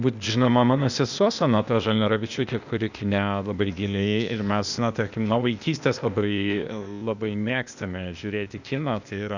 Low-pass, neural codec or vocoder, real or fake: 7.2 kHz; codec, 16 kHz, 0.8 kbps, ZipCodec; fake